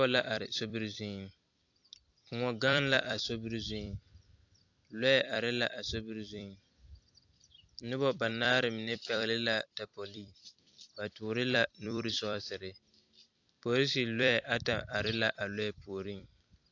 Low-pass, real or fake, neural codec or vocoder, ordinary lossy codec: 7.2 kHz; fake; vocoder, 44.1 kHz, 128 mel bands every 256 samples, BigVGAN v2; AAC, 48 kbps